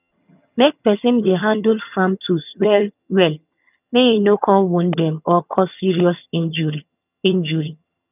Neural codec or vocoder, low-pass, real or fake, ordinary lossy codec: vocoder, 22.05 kHz, 80 mel bands, HiFi-GAN; 3.6 kHz; fake; none